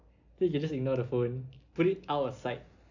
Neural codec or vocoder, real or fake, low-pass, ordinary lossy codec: none; real; 7.2 kHz; none